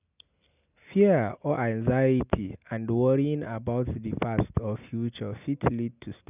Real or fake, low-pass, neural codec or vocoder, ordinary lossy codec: real; 3.6 kHz; none; none